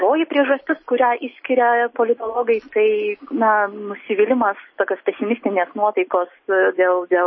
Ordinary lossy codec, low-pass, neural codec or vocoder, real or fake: MP3, 24 kbps; 7.2 kHz; none; real